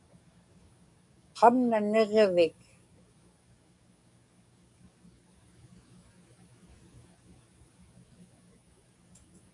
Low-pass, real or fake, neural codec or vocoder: 10.8 kHz; fake; codec, 44.1 kHz, 7.8 kbps, DAC